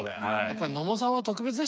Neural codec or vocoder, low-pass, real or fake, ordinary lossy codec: codec, 16 kHz, 4 kbps, FreqCodec, smaller model; none; fake; none